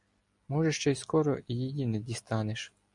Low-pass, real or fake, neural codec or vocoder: 10.8 kHz; real; none